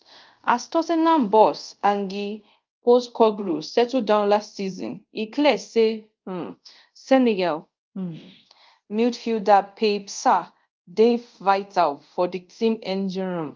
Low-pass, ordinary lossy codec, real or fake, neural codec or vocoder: 7.2 kHz; Opus, 24 kbps; fake; codec, 24 kHz, 0.5 kbps, DualCodec